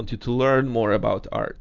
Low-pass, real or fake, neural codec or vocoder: 7.2 kHz; real; none